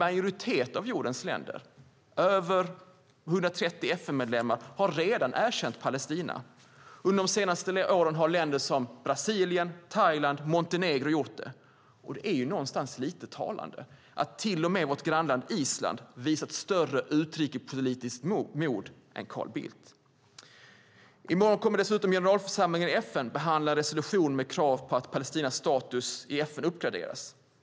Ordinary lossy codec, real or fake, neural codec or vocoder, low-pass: none; real; none; none